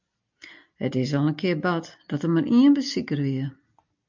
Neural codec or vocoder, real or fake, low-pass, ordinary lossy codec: none; real; 7.2 kHz; MP3, 64 kbps